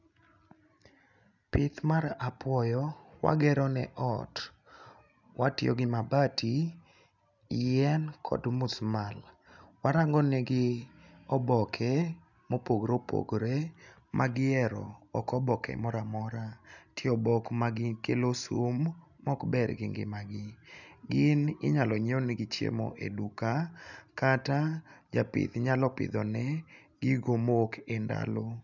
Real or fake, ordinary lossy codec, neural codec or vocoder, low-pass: real; none; none; 7.2 kHz